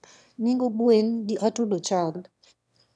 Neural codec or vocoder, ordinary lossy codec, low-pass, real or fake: autoencoder, 22.05 kHz, a latent of 192 numbers a frame, VITS, trained on one speaker; none; none; fake